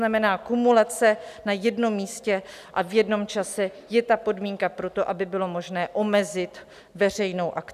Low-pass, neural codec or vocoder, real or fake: 14.4 kHz; none; real